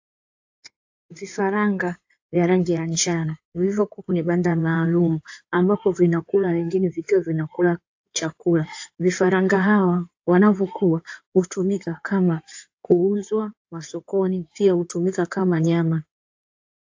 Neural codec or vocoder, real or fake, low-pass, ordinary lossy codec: codec, 16 kHz in and 24 kHz out, 2.2 kbps, FireRedTTS-2 codec; fake; 7.2 kHz; AAC, 48 kbps